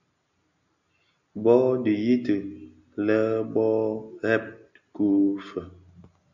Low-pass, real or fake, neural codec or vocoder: 7.2 kHz; real; none